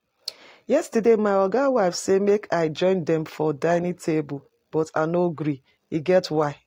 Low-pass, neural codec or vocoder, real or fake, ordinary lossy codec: 19.8 kHz; none; real; AAC, 48 kbps